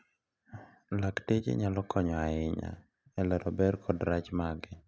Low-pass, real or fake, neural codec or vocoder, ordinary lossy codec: none; real; none; none